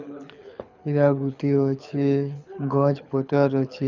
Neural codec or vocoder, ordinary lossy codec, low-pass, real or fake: codec, 24 kHz, 6 kbps, HILCodec; none; 7.2 kHz; fake